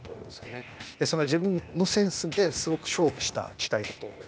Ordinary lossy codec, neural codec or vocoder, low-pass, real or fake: none; codec, 16 kHz, 0.8 kbps, ZipCodec; none; fake